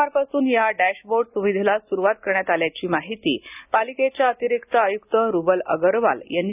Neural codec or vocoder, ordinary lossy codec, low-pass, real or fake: none; none; 3.6 kHz; real